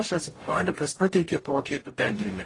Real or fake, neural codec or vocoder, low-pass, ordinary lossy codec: fake; codec, 44.1 kHz, 0.9 kbps, DAC; 10.8 kHz; AAC, 32 kbps